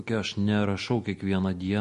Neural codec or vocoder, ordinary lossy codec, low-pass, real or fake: none; MP3, 48 kbps; 10.8 kHz; real